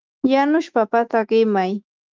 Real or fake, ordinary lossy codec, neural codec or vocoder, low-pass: fake; Opus, 24 kbps; autoencoder, 48 kHz, 128 numbers a frame, DAC-VAE, trained on Japanese speech; 7.2 kHz